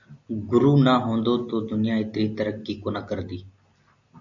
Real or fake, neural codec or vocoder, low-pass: real; none; 7.2 kHz